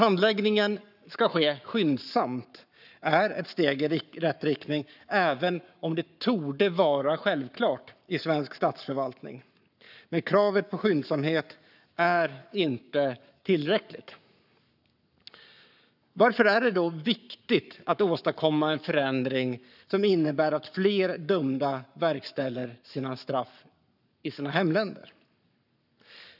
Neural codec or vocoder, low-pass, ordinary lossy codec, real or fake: codec, 44.1 kHz, 7.8 kbps, Pupu-Codec; 5.4 kHz; none; fake